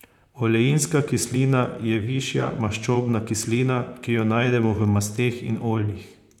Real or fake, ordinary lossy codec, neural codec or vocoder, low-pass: fake; none; vocoder, 44.1 kHz, 128 mel bands, Pupu-Vocoder; 19.8 kHz